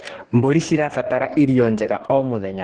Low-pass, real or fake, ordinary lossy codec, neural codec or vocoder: 10.8 kHz; fake; Opus, 16 kbps; codec, 44.1 kHz, 2.6 kbps, DAC